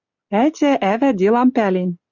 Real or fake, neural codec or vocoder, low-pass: real; none; 7.2 kHz